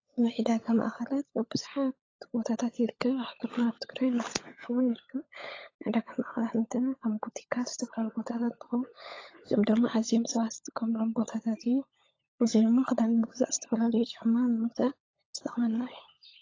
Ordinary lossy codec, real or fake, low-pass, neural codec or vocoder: AAC, 32 kbps; fake; 7.2 kHz; codec, 16 kHz, 16 kbps, FunCodec, trained on LibriTTS, 50 frames a second